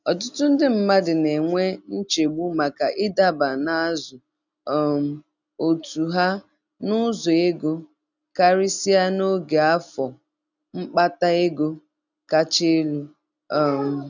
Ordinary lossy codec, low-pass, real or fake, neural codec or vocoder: none; 7.2 kHz; real; none